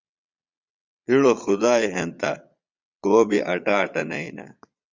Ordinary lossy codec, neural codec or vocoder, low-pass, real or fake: Opus, 64 kbps; vocoder, 22.05 kHz, 80 mel bands, Vocos; 7.2 kHz; fake